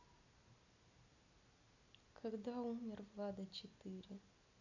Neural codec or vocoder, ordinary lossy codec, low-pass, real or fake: none; none; 7.2 kHz; real